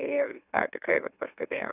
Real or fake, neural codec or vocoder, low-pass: fake; autoencoder, 44.1 kHz, a latent of 192 numbers a frame, MeloTTS; 3.6 kHz